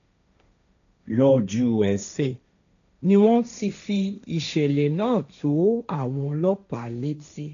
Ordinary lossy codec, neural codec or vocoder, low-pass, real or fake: none; codec, 16 kHz, 1.1 kbps, Voila-Tokenizer; 7.2 kHz; fake